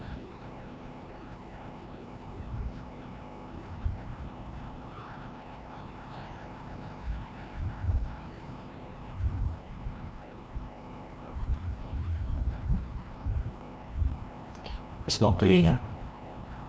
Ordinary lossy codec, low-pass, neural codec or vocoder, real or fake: none; none; codec, 16 kHz, 1 kbps, FreqCodec, larger model; fake